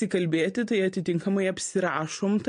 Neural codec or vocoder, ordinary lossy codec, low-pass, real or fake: none; MP3, 48 kbps; 9.9 kHz; real